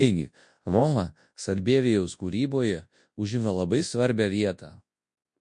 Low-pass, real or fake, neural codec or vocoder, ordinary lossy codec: 10.8 kHz; fake; codec, 24 kHz, 0.9 kbps, WavTokenizer, large speech release; MP3, 48 kbps